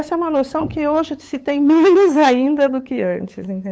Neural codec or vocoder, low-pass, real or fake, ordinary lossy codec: codec, 16 kHz, 8 kbps, FunCodec, trained on LibriTTS, 25 frames a second; none; fake; none